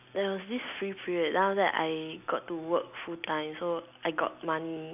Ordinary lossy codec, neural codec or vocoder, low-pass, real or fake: none; none; 3.6 kHz; real